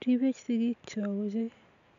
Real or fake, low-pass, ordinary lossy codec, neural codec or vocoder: real; 7.2 kHz; AAC, 96 kbps; none